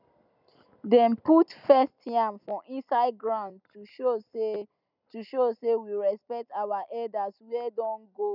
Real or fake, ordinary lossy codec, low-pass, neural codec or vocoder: real; none; 5.4 kHz; none